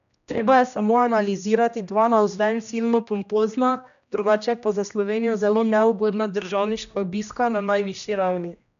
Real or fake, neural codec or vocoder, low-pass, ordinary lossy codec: fake; codec, 16 kHz, 1 kbps, X-Codec, HuBERT features, trained on general audio; 7.2 kHz; none